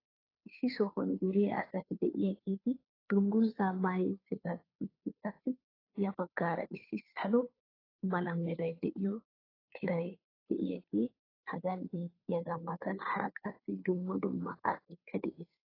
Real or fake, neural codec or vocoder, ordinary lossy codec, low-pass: fake; codec, 16 kHz, 2 kbps, FunCodec, trained on Chinese and English, 25 frames a second; AAC, 24 kbps; 5.4 kHz